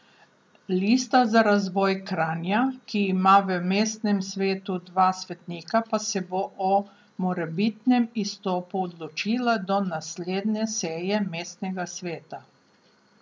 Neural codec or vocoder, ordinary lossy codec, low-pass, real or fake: none; none; none; real